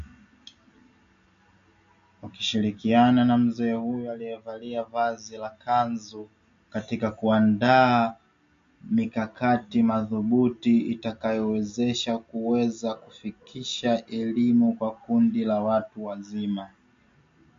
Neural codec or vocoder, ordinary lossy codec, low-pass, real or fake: none; MP3, 48 kbps; 7.2 kHz; real